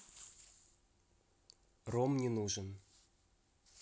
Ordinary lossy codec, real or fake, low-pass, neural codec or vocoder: none; real; none; none